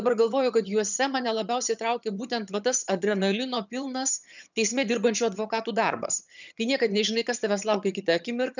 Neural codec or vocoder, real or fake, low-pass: vocoder, 22.05 kHz, 80 mel bands, HiFi-GAN; fake; 7.2 kHz